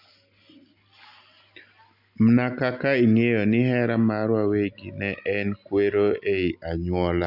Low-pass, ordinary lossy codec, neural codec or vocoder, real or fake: 5.4 kHz; none; none; real